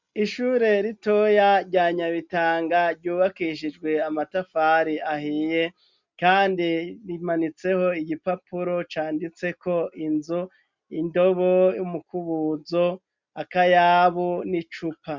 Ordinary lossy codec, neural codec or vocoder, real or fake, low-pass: MP3, 64 kbps; none; real; 7.2 kHz